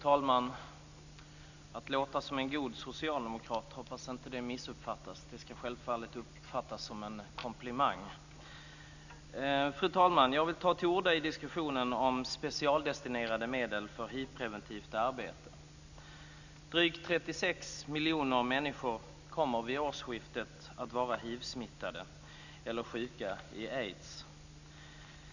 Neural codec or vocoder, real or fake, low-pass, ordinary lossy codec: none; real; 7.2 kHz; none